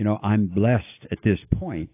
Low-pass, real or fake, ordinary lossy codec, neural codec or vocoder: 3.6 kHz; real; AAC, 24 kbps; none